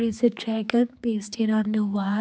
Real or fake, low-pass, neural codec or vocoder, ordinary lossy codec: fake; none; codec, 16 kHz, 4 kbps, X-Codec, HuBERT features, trained on general audio; none